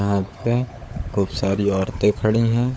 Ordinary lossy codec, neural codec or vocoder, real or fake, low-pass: none; codec, 16 kHz, 16 kbps, FunCodec, trained on LibriTTS, 50 frames a second; fake; none